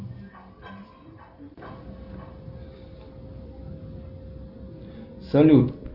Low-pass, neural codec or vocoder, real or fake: 5.4 kHz; none; real